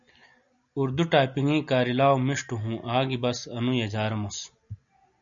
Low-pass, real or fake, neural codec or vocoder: 7.2 kHz; real; none